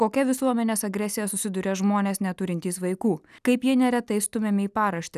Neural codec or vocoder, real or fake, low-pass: none; real; 14.4 kHz